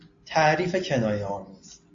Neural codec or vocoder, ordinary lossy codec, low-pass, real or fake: none; AAC, 48 kbps; 7.2 kHz; real